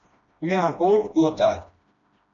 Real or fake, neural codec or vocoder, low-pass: fake; codec, 16 kHz, 1 kbps, FreqCodec, smaller model; 7.2 kHz